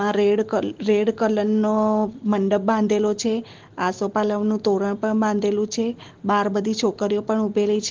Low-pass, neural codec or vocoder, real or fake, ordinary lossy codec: 7.2 kHz; none; real; Opus, 16 kbps